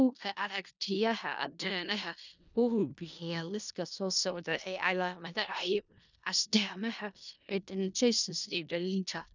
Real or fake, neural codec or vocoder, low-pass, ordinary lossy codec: fake; codec, 16 kHz in and 24 kHz out, 0.4 kbps, LongCat-Audio-Codec, four codebook decoder; 7.2 kHz; none